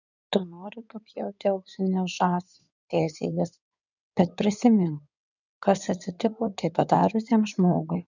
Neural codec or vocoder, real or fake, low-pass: codec, 16 kHz in and 24 kHz out, 2.2 kbps, FireRedTTS-2 codec; fake; 7.2 kHz